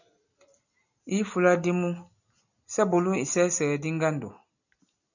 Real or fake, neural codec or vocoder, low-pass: real; none; 7.2 kHz